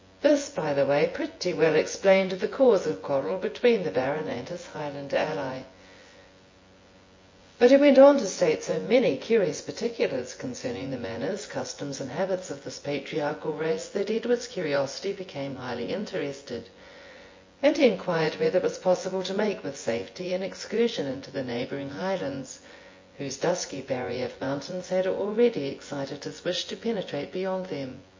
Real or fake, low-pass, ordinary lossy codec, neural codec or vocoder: fake; 7.2 kHz; MP3, 32 kbps; vocoder, 24 kHz, 100 mel bands, Vocos